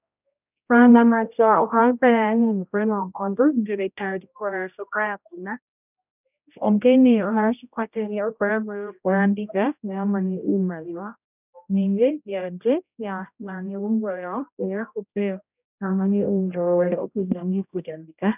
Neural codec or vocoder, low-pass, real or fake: codec, 16 kHz, 0.5 kbps, X-Codec, HuBERT features, trained on general audio; 3.6 kHz; fake